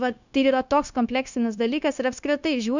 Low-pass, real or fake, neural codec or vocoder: 7.2 kHz; fake; codec, 16 kHz, 0.9 kbps, LongCat-Audio-Codec